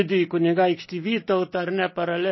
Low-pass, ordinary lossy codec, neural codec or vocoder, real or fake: 7.2 kHz; MP3, 24 kbps; none; real